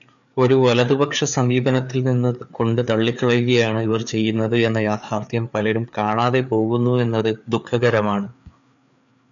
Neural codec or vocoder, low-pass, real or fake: codec, 16 kHz, 4 kbps, FreqCodec, larger model; 7.2 kHz; fake